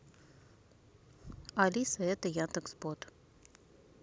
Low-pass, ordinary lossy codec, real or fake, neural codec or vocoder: none; none; real; none